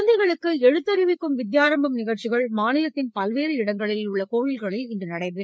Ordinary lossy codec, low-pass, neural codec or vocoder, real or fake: none; none; codec, 16 kHz, 4 kbps, FreqCodec, larger model; fake